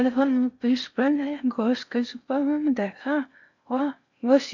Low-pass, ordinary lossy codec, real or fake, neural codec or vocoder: 7.2 kHz; none; fake; codec, 16 kHz in and 24 kHz out, 0.6 kbps, FocalCodec, streaming, 4096 codes